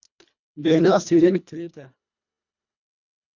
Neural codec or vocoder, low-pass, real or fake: codec, 24 kHz, 1.5 kbps, HILCodec; 7.2 kHz; fake